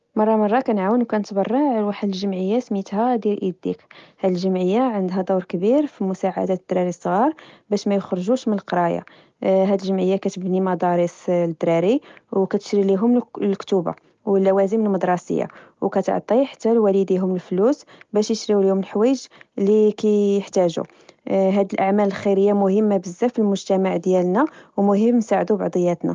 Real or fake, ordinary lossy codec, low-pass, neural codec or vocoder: real; Opus, 32 kbps; 7.2 kHz; none